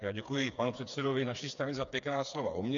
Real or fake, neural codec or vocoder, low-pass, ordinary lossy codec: fake; codec, 16 kHz, 4 kbps, FreqCodec, smaller model; 7.2 kHz; MP3, 64 kbps